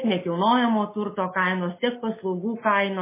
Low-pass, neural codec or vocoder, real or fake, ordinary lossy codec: 3.6 kHz; none; real; MP3, 16 kbps